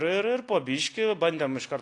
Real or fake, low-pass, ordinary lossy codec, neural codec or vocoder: real; 10.8 kHz; AAC, 48 kbps; none